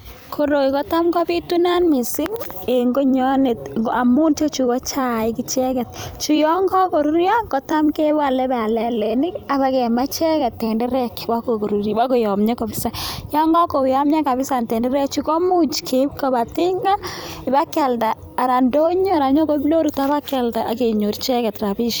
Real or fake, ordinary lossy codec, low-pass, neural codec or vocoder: fake; none; none; vocoder, 44.1 kHz, 128 mel bands every 256 samples, BigVGAN v2